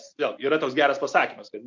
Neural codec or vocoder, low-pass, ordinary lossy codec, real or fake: none; 7.2 kHz; MP3, 48 kbps; real